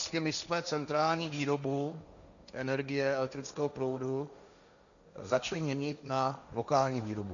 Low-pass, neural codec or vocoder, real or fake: 7.2 kHz; codec, 16 kHz, 1.1 kbps, Voila-Tokenizer; fake